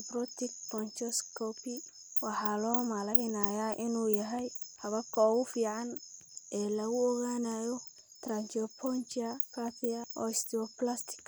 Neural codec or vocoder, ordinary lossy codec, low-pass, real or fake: none; none; none; real